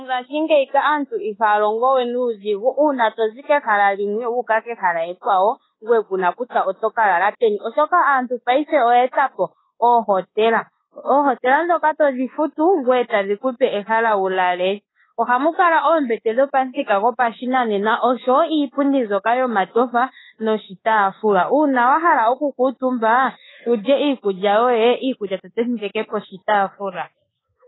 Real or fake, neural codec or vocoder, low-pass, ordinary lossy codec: fake; codec, 24 kHz, 1.2 kbps, DualCodec; 7.2 kHz; AAC, 16 kbps